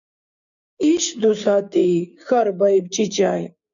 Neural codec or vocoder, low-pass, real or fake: codec, 16 kHz, 6 kbps, DAC; 7.2 kHz; fake